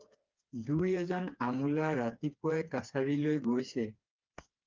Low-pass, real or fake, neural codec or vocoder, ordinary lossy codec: 7.2 kHz; fake; codec, 16 kHz, 4 kbps, FreqCodec, smaller model; Opus, 24 kbps